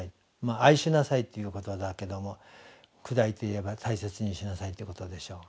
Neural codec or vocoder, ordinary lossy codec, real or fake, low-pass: none; none; real; none